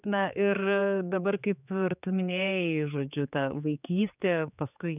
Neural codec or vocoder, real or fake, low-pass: codec, 16 kHz, 4 kbps, X-Codec, HuBERT features, trained on general audio; fake; 3.6 kHz